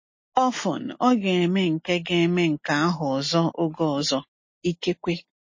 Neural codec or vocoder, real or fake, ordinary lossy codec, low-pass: none; real; MP3, 32 kbps; 7.2 kHz